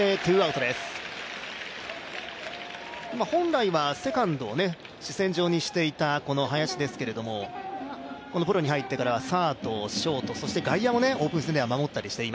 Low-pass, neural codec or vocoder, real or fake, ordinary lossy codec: none; none; real; none